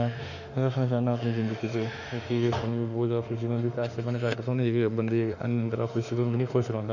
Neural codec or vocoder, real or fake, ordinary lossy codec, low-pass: autoencoder, 48 kHz, 32 numbers a frame, DAC-VAE, trained on Japanese speech; fake; none; 7.2 kHz